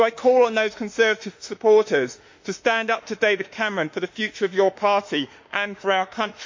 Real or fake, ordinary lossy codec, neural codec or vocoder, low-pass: fake; MP3, 48 kbps; autoencoder, 48 kHz, 32 numbers a frame, DAC-VAE, trained on Japanese speech; 7.2 kHz